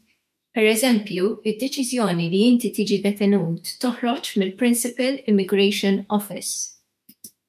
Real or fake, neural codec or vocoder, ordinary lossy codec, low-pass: fake; autoencoder, 48 kHz, 32 numbers a frame, DAC-VAE, trained on Japanese speech; MP3, 96 kbps; 14.4 kHz